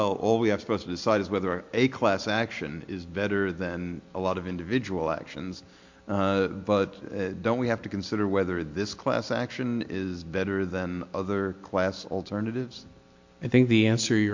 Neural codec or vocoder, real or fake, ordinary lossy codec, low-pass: none; real; MP3, 48 kbps; 7.2 kHz